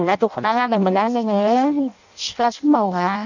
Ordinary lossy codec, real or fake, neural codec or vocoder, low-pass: none; fake; codec, 16 kHz in and 24 kHz out, 0.6 kbps, FireRedTTS-2 codec; 7.2 kHz